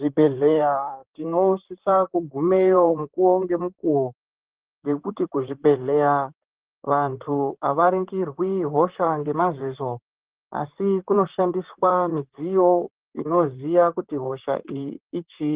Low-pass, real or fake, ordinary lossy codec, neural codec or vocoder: 3.6 kHz; fake; Opus, 16 kbps; vocoder, 44.1 kHz, 128 mel bands, Pupu-Vocoder